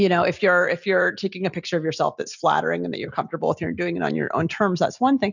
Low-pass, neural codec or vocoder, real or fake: 7.2 kHz; none; real